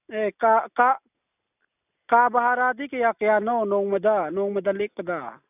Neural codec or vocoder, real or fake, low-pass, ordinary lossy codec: none; real; 3.6 kHz; none